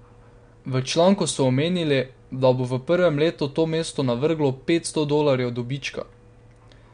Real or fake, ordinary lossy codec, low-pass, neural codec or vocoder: real; MP3, 64 kbps; 9.9 kHz; none